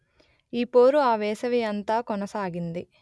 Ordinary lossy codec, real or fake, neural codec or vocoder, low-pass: none; real; none; none